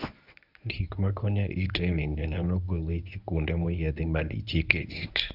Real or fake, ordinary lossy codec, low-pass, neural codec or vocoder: fake; none; 5.4 kHz; codec, 24 kHz, 0.9 kbps, WavTokenizer, medium speech release version 1